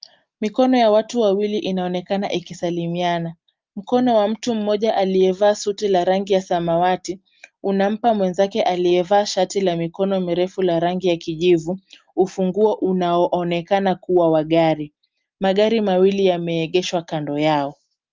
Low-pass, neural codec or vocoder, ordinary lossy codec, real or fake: 7.2 kHz; none; Opus, 32 kbps; real